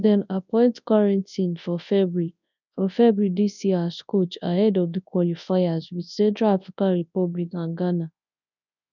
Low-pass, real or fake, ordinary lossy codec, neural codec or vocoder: 7.2 kHz; fake; none; codec, 24 kHz, 0.9 kbps, WavTokenizer, large speech release